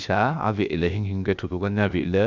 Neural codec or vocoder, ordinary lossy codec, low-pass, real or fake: codec, 16 kHz, 0.7 kbps, FocalCodec; none; 7.2 kHz; fake